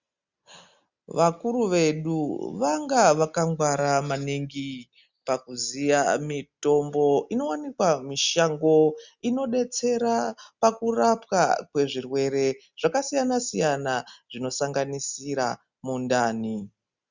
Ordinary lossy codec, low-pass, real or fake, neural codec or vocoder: Opus, 64 kbps; 7.2 kHz; real; none